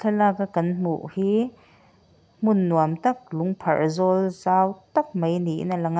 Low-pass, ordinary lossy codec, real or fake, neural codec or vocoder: none; none; real; none